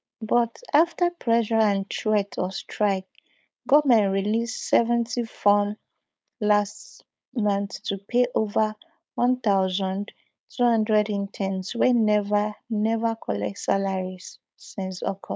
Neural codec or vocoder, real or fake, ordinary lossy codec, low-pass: codec, 16 kHz, 4.8 kbps, FACodec; fake; none; none